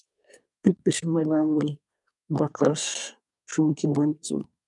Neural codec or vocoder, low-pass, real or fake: codec, 24 kHz, 1 kbps, SNAC; 10.8 kHz; fake